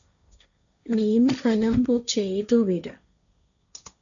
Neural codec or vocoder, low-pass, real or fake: codec, 16 kHz, 1.1 kbps, Voila-Tokenizer; 7.2 kHz; fake